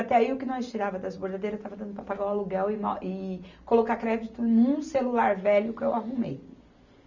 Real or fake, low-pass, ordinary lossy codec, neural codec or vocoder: real; 7.2 kHz; none; none